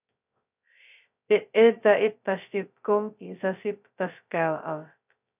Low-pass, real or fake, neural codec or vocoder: 3.6 kHz; fake; codec, 16 kHz, 0.2 kbps, FocalCodec